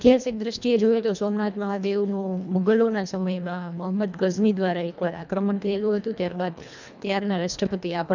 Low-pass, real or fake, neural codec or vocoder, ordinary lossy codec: 7.2 kHz; fake; codec, 24 kHz, 1.5 kbps, HILCodec; none